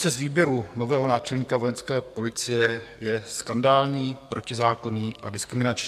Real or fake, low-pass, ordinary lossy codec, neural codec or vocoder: fake; 14.4 kHz; MP3, 96 kbps; codec, 44.1 kHz, 2.6 kbps, SNAC